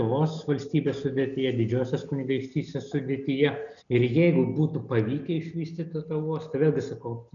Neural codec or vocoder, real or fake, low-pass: none; real; 7.2 kHz